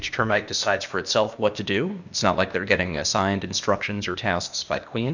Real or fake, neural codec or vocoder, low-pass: fake; codec, 16 kHz, 0.8 kbps, ZipCodec; 7.2 kHz